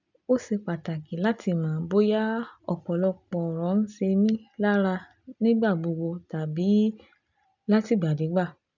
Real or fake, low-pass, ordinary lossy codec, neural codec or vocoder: real; 7.2 kHz; none; none